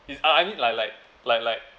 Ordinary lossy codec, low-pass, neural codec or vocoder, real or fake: none; none; none; real